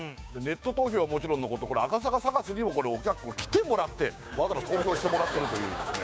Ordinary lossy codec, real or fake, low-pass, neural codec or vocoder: none; fake; none; codec, 16 kHz, 6 kbps, DAC